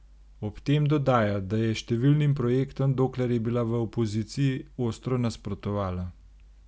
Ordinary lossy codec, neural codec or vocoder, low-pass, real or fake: none; none; none; real